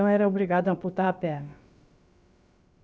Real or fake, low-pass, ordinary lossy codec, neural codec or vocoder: fake; none; none; codec, 16 kHz, about 1 kbps, DyCAST, with the encoder's durations